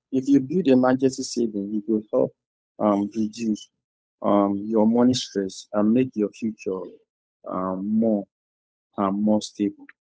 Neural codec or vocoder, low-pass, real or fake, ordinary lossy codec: codec, 16 kHz, 8 kbps, FunCodec, trained on Chinese and English, 25 frames a second; none; fake; none